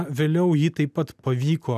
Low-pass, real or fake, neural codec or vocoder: 14.4 kHz; real; none